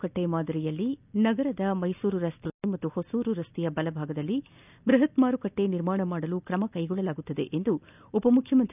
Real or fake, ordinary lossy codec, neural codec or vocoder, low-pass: real; none; none; 3.6 kHz